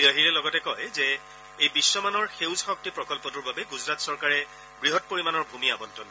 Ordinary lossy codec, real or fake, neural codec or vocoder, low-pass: none; real; none; none